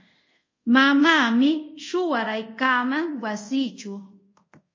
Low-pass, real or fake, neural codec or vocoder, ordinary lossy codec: 7.2 kHz; fake; codec, 24 kHz, 0.5 kbps, DualCodec; MP3, 32 kbps